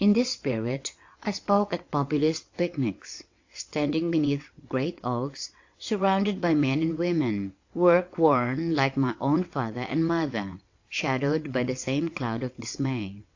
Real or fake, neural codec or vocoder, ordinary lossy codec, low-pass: fake; vocoder, 22.05 kHz, 80 mel bands, WaveNeXt; AAC, 48 kbps; 7.2 kHz